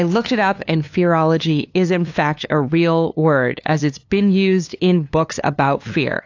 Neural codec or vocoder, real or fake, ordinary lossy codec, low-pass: codec, 16 kHz, 2 kbps, FunCodec, trained on LibriTTS, 25 frames a second; fake; AAC, 48 kbps; 7.2 kHz